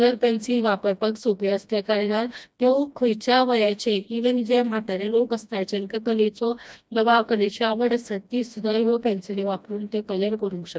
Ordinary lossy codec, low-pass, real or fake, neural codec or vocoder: none; none; fake; codec, 16 kHz, 1 kbps, FreqCodec, smaller model